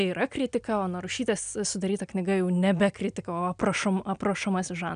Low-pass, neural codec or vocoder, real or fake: 9.9 kHz; none; real